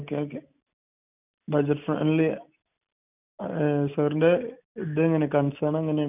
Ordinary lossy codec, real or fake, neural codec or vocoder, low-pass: none; real; none; 3.6 kHz